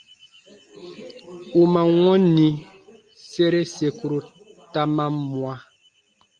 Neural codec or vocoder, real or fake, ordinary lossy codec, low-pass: none; real; Opus, 32 kbps; 9.9 kHz